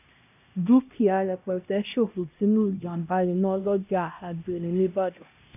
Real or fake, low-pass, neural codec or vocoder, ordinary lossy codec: fake; 3.6 kHz; codec, 16 kHz, 1 kbps, X-Codec, HuBERT features, trained on LibriSpeech; none